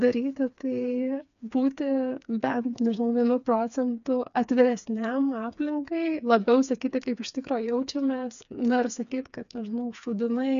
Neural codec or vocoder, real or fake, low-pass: codec, 16 kHz, 4 kbps, FreqCodec, smaller model; fake; 7.2 kHz